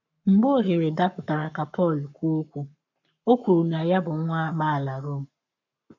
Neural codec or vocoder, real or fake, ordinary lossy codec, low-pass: codec, 44.1 kHz, 7.8 kbps, Pupu-Codec; fake; none; 7.2 kHz